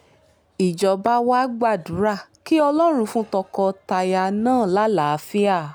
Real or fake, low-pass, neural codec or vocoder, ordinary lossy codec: real; none; none; none